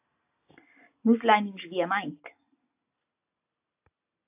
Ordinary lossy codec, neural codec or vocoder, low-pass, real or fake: AAC, 32 kbps; none; 3.6 kHz; real